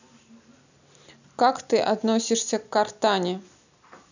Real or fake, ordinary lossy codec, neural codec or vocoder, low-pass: real; none; none; 7.2 kHz